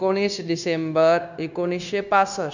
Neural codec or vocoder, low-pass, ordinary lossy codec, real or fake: codec, 16 kHz, 0.9 kbps, LongCat-Audio-Codec; 7.2 kHz; none; fake